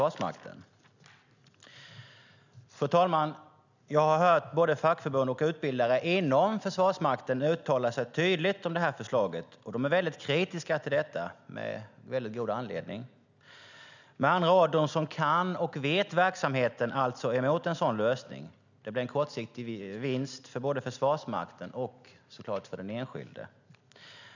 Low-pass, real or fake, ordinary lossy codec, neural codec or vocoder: 7.2 kHz; real; none; none